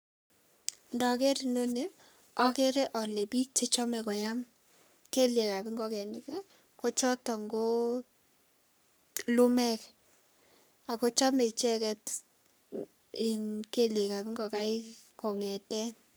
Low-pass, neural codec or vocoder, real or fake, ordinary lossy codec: none; codec, 44.1 kHz, 3.4 kbps, Pupu-Codec; fake; none